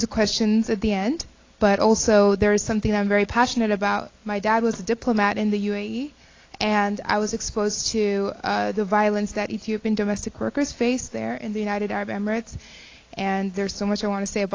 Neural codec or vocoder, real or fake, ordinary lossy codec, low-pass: none; real; AAC, 32 kbps; 7.2 kHz